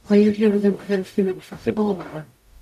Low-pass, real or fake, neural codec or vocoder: 14.4 kHz; fake; codec, 44.1 kHz, 0.9 kbps, DAC